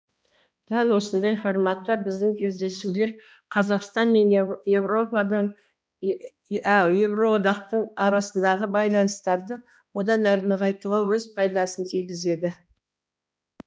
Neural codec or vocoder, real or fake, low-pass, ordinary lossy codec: codec, 16 kHz, 1 kbps, X-Codec, HuBERT features, trained on balanced general audio; fake; none; none